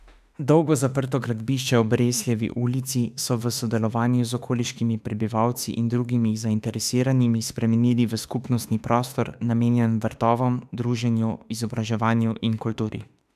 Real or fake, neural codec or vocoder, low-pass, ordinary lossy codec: fake; autoencoder, 48 kHz, 32 numbers a frame, DAC-VAE, trained on Japanese speech; 14.4 kHz; none